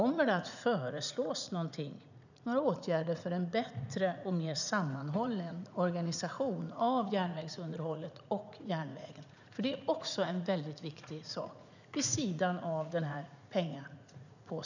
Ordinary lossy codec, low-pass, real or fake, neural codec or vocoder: none; 7.2 kHz; fake; vocoder, 44.1 kHz, 80 mel bands, Vocos